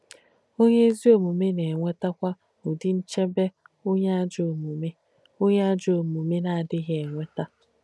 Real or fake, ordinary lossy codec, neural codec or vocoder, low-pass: real; none; none; none